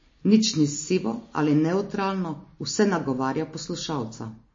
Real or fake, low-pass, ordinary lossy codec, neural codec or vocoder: real; 7.2 kHz; MP3, 32 kbps; none